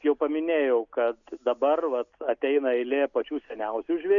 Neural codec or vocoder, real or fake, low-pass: none; real; 7.2 kHz